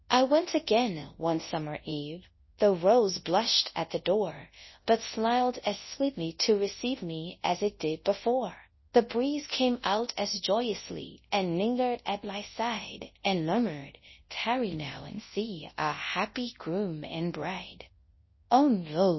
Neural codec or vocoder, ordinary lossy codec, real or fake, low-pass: codec, 24 kHz, 0.9 kbps, WavTokenizer, large speech release; MP3, 24 kbps; fake; 7.2 kHz